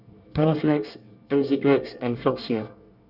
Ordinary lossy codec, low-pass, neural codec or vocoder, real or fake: Opus, 64 kbps; 5.4 kHz; codec, 24 kHz, 1 kbps, SNAC; fake